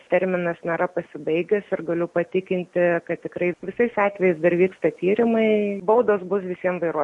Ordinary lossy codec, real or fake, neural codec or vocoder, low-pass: MP3, 48 kbps; real; none; 10.8 kHz